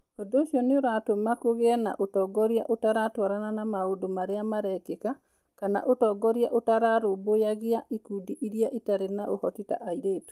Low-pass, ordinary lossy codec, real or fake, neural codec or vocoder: 14.4 kHz; Opus, 32 kbps; real; none